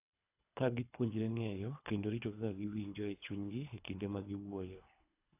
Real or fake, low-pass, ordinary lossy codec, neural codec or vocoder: fake; 3.6 kHz; AAC, 24 kbps; codec, 24 kHz, 3 kbps, HILCodec